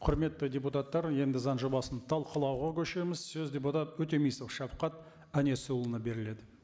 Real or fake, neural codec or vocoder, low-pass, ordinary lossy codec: real; none; none; none